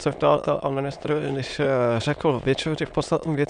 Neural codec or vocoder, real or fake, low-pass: autoencoder, 22.05 kHz, a latent of 192 numbers a frame, VITS, trained on many speakers; fake; 9.9 kHz